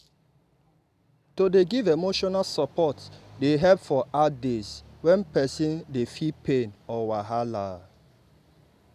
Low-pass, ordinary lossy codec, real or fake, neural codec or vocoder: 14.4 kHz; none; real; none